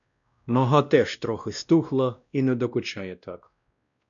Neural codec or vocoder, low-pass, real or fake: codec, 16 kHz, 1 kbps, X-Codec, WavLM features, trained on Multilingual LibriSpeech; 7.2 kHz; fake